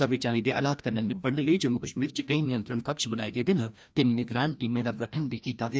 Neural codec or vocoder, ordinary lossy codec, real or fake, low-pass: codec, 16 kHz, 1 kbps, FreqCodec, larger model; none; fake; none